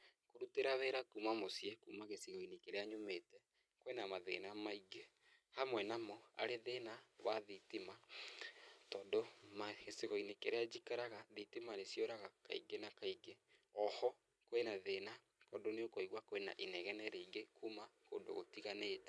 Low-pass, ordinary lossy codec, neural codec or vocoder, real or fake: none; none; none; real